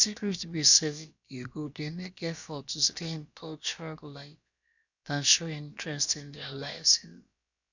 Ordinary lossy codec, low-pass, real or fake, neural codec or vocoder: none; 7.2 kHz; fake; codec, 16 kHz, about 1 kbps, DyCAST, with the encoder's durations